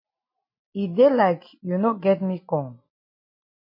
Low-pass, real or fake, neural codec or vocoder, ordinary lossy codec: 5.4 kHz; real; none; MP3, 24 kbps